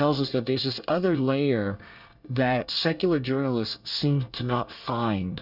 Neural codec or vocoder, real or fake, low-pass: codec, 24 kHz, 1 kbps, SNAC; fake; 5.4 kHz